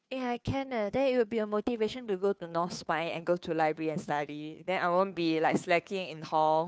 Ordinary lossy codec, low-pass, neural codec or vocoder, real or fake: none; none; codec, 16 kHz, 2 kbps, FunCodec, trained on Chinese and English, 25 frames a second; fake